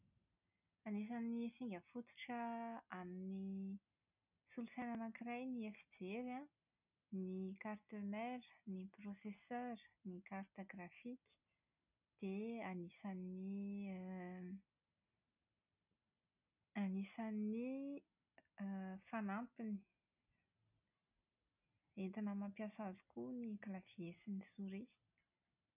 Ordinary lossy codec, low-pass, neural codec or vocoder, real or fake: none; 3.6 kHz; none; real